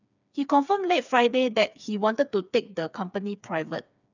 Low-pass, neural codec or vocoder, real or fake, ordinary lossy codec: 7.2 kHz; codec, 16 kHz, 4 kbps, FreqCodec, smaller model; fake; none